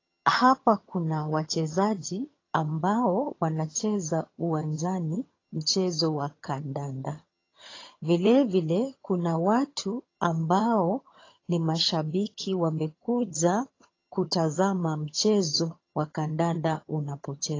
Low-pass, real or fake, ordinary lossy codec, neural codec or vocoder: 7.2 kHz; fake; AAC, 32 kbps; vocoder, 22.05 kHz, 80 mel bands, HiFi-GAN